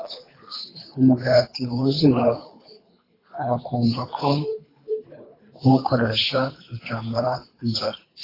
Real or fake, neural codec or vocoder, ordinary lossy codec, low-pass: fake; codec, 24 kHz, 3 kbps, HILCodec; AAC, 24 kbps; 5.4 kHz